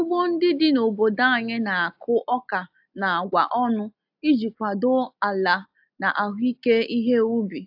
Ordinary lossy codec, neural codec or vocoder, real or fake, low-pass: AAC, 48 kbps; none; real; 5.4 kHz